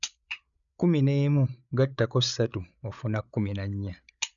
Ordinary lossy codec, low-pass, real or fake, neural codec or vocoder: none; 7.2 kHz; fake; codec, 16 kHz, 8 kbps, FreqCodec, larger model